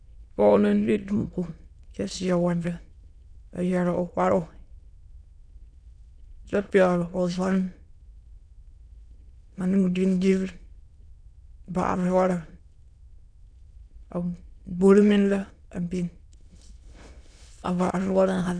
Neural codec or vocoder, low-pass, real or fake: autoencoder, 22.05 kHz, a latent of 192 numbers a frame, VITS, trained on many speakers; 9.9 kHz; fake